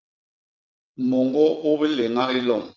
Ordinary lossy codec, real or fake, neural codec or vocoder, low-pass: MP3, 64 kbps; fake; vocoder, 22.05 kHz, 80 mel bands, WaveNeXt; 7.2 kHz